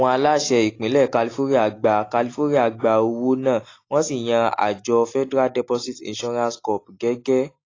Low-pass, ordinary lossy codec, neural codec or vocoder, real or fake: 7.2 kHz; AAC, 32 kbps; none; real